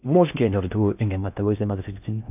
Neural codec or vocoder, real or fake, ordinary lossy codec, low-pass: codec, 16 kHz in and 24 kHz out, 0.6 kbps, FocalCodec, streaming, 4096 codes; fake; none; 3.6 kHz